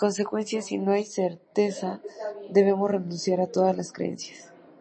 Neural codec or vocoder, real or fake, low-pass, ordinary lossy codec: none; real; 9.9 kHz; MP3, 32 kbps